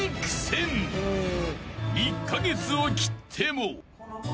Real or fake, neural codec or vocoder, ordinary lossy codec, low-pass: real; none; none; none